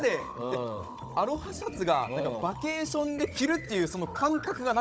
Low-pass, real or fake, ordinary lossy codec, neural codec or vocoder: none; fake; none; codec, 16 kHz, 16 kbps, FunCodec, trained on Chinese and English, 50 frames a second